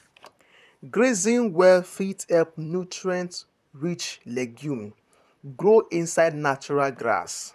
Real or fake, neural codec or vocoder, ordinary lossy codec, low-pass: fake; vocoder, 44.1 kHz, 128 mel bands, Pupu-Vocoder; none; 14.4 kHz